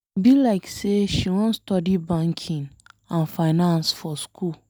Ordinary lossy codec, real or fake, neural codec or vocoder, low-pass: none; real; none; none